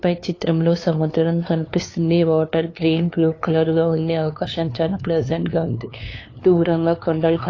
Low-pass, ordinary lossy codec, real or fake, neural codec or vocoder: 7.2 kHz; AAC, 32 kbps; fake; codec, 16 kHz, 2 kbps, FunCodec, trained on LibriTTS, 25 frames a second